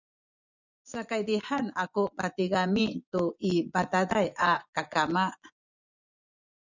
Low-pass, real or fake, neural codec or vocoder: 7.2 kHz; fake; vocoder, 24 kHz, 100 mel bands, Vocos